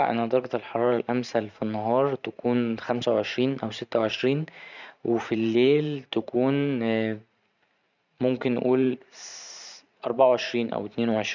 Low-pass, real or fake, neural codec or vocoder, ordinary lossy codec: 7.2 kHz; fake; vocoder, 44.1 kHz, 128 mel bands every 512 samples, BigVGAN v2; none